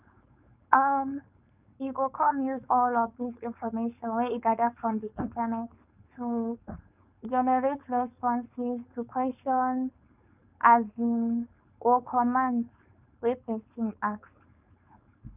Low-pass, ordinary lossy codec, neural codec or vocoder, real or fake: 3.6 kHz; none; codec, 16 kHz, 4.8 kbps, FACodec; fake